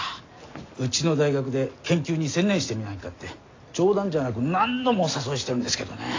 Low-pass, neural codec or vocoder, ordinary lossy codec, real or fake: 7.2 kHz; none; AAC, 32 kbps; real